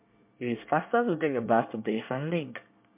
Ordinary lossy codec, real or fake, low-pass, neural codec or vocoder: MP3, 32 kbps; fake; 3.6 kHz; codec, 24 kHz, 1 kbps, SNAC